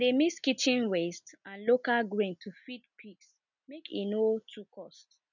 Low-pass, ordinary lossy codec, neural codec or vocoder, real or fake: 7.2 kHz; none; none; real